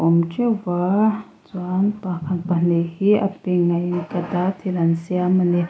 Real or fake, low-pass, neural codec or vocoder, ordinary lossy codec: real; none; none; none